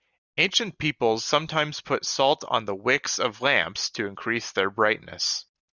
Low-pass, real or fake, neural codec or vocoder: 7.2 kHz; real; none